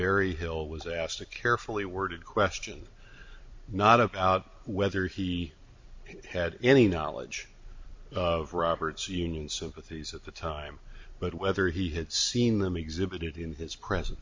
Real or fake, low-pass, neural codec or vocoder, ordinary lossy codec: fake; 7.2 kHz; vocoder, 44.1 kHz, 128 mel bands every 256 samples, BigVGAN v2; MP3, 48 kbps